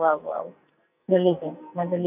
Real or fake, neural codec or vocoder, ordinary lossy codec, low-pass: fake; codec, 44.1 kHz, 2.6 kbps, SNAC; none; 3.6 kHz